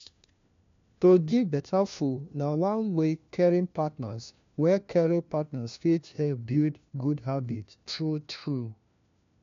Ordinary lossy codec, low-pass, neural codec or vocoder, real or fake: MP3, 64 kbps; 7.2 kHz; codec, 16 kHz, 1 kbps, FunCodec, trained on LibriTTS, 50 frames a second; fake